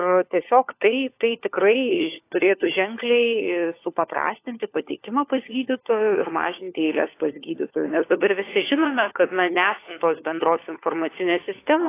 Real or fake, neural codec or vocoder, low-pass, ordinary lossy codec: fake; codec, 16 kHz, 4 kbps, FunCodec, trained on LibriTTS, 50 frames a second; 3.6 kHz; AAC, 24 kbps